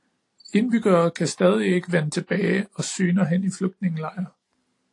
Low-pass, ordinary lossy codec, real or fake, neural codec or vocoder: 10.8 kHz; AAC, 48 kbps; real; none